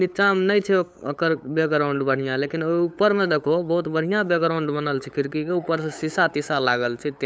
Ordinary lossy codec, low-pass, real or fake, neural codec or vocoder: none; none; fake; codec, 16 kHz, 8 kbps, FunCodec, trained on LibriTTS, 25 frames a second